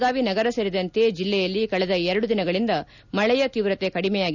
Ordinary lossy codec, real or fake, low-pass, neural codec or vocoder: none; real; 7.2 kHz; none